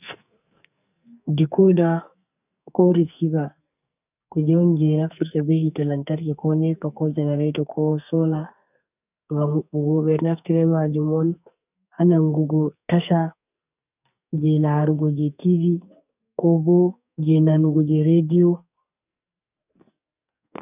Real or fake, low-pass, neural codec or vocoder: fake; 3.6 kHz; codec, 44.1 kHz, 2.6 kbps, SNAC